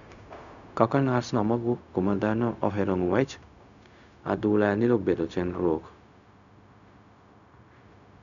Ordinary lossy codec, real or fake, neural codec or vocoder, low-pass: none; fake; codec, 16 kHz, 0.4 kbps, LongCat-Audio-Codec; 7.2 kHz